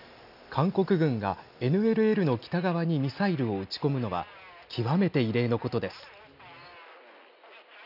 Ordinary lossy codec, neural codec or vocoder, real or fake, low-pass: none; none; real; 5.4 kHz